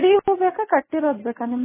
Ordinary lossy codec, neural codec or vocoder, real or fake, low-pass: MP3, 16 kbps; none; real; 3.6 kHz